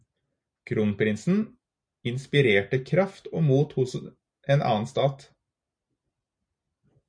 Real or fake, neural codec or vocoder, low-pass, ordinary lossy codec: real; none; 9.9 kHz; MP3, 96 kbps